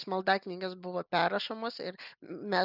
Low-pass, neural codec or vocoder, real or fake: 5.4 kHz; none; real